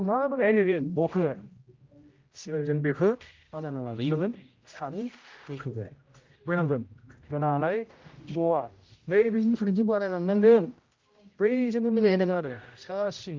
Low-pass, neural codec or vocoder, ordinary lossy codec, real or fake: 7.2 kHz; codec, 16 kHz, 0.5 kbps, X-Codec, HuBERT features, trained on general audio; Opus, 24 kbps; fake